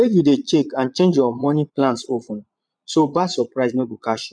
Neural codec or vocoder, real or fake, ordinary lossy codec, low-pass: vocoder, 22.05 kHz, 80 mel bands, Vocos; fake; none; 9.9 kHz